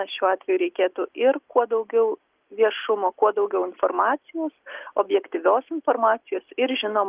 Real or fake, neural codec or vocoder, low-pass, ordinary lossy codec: real; none; 3.6 kHz; Opus, 32 kbps